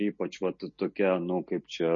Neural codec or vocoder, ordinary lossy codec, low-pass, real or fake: none; MP3, 32 kbps; 7.2 kHz; real